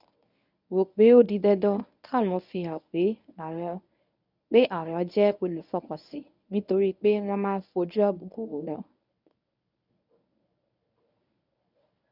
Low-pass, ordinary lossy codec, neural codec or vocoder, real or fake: 5.4 kHz; none; codec, 24 kHz, 0.9 kbps, WavTokenizer, medium speech release version 1; fake